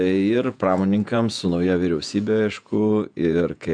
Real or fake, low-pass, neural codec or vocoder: fake; 9.9 kHz; vocoder, 48 kHz, 128 mel bands, Vocos